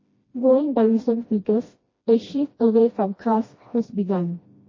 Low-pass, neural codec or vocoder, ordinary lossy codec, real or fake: 7.2 kHz; codec, 16 kHz, 1 kbps, FreqCodec, smaller model; MP3, 32 kbps; fake